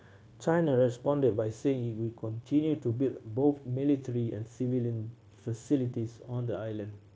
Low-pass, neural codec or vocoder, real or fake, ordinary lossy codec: none; codec, 16 kHz, 0.9 kbps, LongCat-Audio-Codec; fake; none